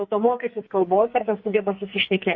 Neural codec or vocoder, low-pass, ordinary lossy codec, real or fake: codec, 44.1 kHz, 2.6 kbps, SNAC; 7.2 kHz; MP3, 32 kbps; fake